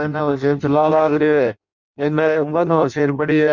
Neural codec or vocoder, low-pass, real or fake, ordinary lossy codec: codec, 16 kHz in and 24 kHz out, 0.6 kbps, FireRedTTS-2 codec; 7.2 kHz; fake; none